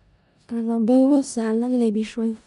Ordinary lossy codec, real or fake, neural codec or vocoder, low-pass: none; fake; codec, 16 kHz in and 24 kHz out, 0.4 kbps, LongCat-Audio-Codec, four codebook decoder; 10.8 kHz